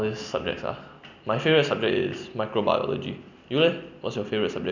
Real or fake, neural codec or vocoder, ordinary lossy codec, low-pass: real; none; none; 7.2 kHz